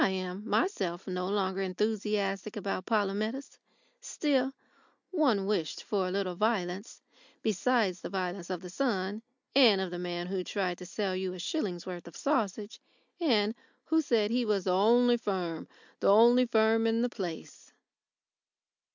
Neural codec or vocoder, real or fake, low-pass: none; real; 7.2 kHz